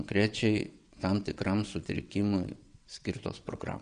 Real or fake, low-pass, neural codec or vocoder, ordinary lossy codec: fake; 9.9 kHz; vocoder, 22.05 kHz, 80 mel bands, WaveNeXt; MP3, 96 kbps